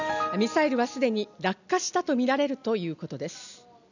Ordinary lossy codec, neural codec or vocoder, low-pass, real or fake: none; none; 7.2 kHz; real